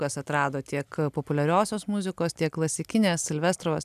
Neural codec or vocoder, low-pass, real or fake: none; 14.4 kHz; real